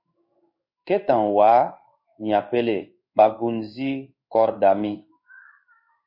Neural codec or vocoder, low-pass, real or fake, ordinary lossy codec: codec, 16 kHz in and 24 kHz out, 1 kbps, XY-Tokenizer; 5.4 kHz; fake; MP3, 32 kbps